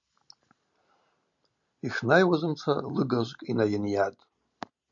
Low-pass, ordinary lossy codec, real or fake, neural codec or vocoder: 7.2 kHz; MP3, 96 kbps; real; none